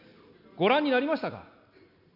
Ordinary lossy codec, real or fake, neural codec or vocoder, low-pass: none; real; none; 5.4 kHz